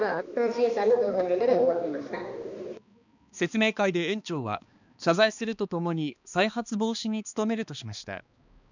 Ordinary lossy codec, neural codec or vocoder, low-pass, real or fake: none; codec, 16 kHz, 2 kbps, X-Codec, HuBERT features, trained on balanced general audio; 7.2 kHz; fake